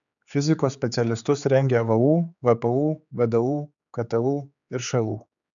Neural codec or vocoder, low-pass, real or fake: codec, 16 kHz, 4 kbps, X-Codec, HuBERT features, trained on general audio; 7.2 kHz; fake